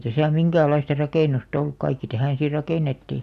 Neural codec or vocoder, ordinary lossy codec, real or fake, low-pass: none; none; real; 14.4 kHz